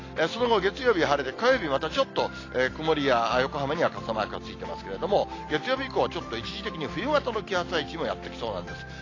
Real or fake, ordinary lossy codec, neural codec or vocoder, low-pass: real; AAC, 32 kbps; none; 7.2 kHz